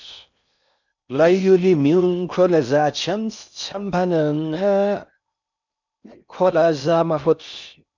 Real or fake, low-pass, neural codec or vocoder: fake; 7.2 kHz; codec, 16 kHz in and 24 kHz out, 0.6 kbps, FocalCodec, streaming, 4096 codes